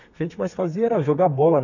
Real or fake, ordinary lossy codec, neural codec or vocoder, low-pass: fake; none; codec, 32 kHz, 1.9 kbps, SNAC; 7.2 kHz